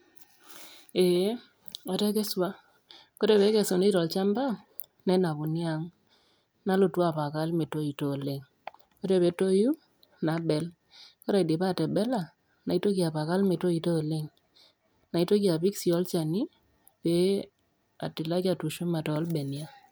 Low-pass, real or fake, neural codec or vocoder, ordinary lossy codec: none; real; none; none